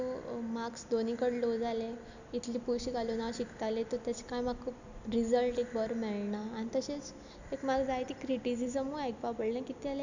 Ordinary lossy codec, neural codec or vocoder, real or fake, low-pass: none; none; real; 7.2 kHz